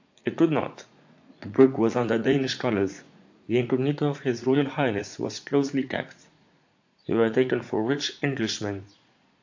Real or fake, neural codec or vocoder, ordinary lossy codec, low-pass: fake; vocoder, 44.1 kHz, 80 mel bands, Vocos; AAC, 48 kbps; 7.2 kHz